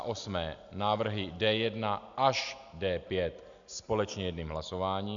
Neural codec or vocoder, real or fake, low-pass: none; real; 7.2 kHz